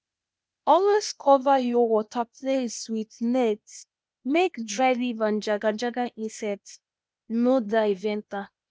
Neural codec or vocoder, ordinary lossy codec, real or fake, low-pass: codec, 16 kHz, 0.8 kbps, ZipCodec; none; fake; none